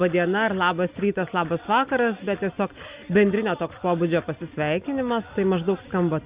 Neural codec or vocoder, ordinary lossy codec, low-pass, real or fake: none; Opus, 64 kbps; 3.6 kHz; real